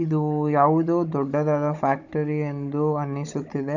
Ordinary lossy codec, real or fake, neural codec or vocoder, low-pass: none; fake; codec, 16 kHz, 16 kbps, FunCodec, trained on Chinese and English, 50 frames a second; 7.2 kHz